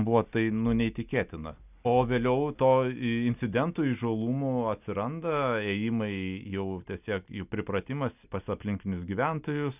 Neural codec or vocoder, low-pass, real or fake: none; 3.6 kHz; real